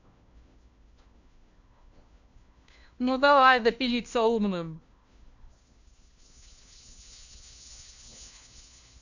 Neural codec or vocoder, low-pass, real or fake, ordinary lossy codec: codec, 16 kHz, 1 kbps, FunCodec, trained on LibriTTS, 50 frames a second; 7.2 kHz; fake; none